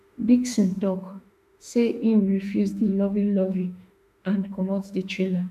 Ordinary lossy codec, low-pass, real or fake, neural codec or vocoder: none; 14.4 kHz; fake; autoencoder, 48 kHz, 32 numbers a frame, DAC-VAE, trained on Japanese speech